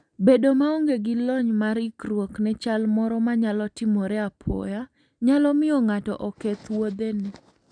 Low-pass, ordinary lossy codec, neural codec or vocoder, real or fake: 9.9 kHz; none; none; real